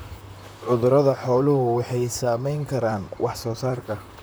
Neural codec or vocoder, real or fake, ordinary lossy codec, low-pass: vocoder, 44.1 kHz, 128 mel bands, Pupu-Vocoder; fake; none; none